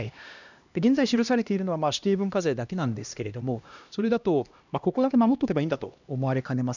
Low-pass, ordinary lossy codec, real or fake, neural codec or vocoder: 7.2 kHz; none; fake; codec, 16 kHz, 1 kbps, X-Codec, HuBERT features, trained on LibriSpeech